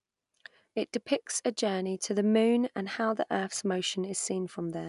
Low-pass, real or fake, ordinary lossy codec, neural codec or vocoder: 10.8 kHz; real; none; none